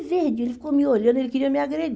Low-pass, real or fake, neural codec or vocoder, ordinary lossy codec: none; real; none; none